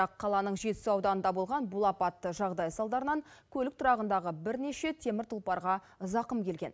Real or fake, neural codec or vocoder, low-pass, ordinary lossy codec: real; none; none; none